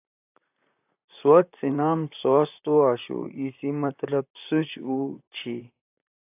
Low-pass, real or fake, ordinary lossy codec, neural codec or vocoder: 3.6 kHz; fake; AAC, 32 kbps; vocoder, 44.1 kHz, 128 mel bands, Pupu-Vocoder